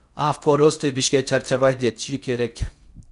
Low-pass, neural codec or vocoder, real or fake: 10.8 kHz; codec, 16 kHz in and 24 kHz out, 0.6 kbps, FocalCodec, streaming, 4096 codes; fake